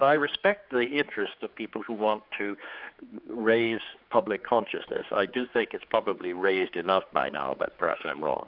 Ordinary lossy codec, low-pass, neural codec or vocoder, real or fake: AAC, 48 kbps; 5.4 kHz; codec, 16 kHz, 4 kbps, X-Codec, HuBERT features, trained on general audio; fake